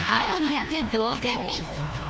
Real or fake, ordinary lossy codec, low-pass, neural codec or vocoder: fake; none; none; codec, 16 kHz, 1 kbps, FunCodec, trained on LibriTTS, 50 frames a second